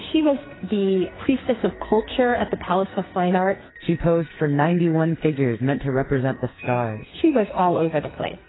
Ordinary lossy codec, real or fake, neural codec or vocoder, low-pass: AAC, 16 kbps; fake; codec, 44.1 kHz, 2.6 kbps, SNAC; 7.2 kHz